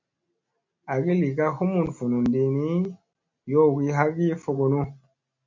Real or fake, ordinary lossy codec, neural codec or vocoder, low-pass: real; MP3, 48 kbps; none; 7.2 kHz